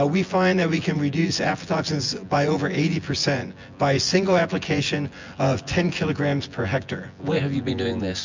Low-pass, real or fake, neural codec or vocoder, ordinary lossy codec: 7.2 kHz; fake; vocoder, 24 kHz, 100 mel bands, Vocos; MP3, 64 kbps